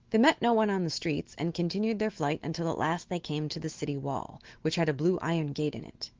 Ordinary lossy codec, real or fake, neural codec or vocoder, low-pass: Opus, 16 kbps; fake; autoencoder, 48 kHz, 128 numbers a frame, DAC-VAE, trained on Japanese speech; 7.2 kHz